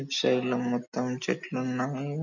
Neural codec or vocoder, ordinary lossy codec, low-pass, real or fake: none; none; 7.2 kHz; real